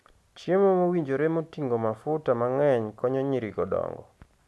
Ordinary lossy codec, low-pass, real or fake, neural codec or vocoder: none; none; real; none